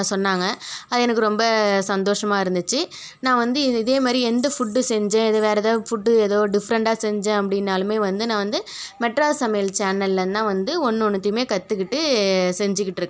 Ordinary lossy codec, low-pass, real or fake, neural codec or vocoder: none; none; real; none